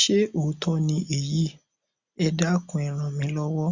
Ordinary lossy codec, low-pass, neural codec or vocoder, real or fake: Opus, 64 kbps; 7.2 kHz; none; real